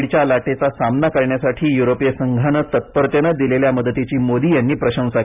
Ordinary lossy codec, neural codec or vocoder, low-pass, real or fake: none; none; 3.6 kHz; real